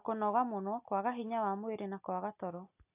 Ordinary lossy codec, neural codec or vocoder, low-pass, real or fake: AAC, 32 kbps; none; 3.6 kHz; real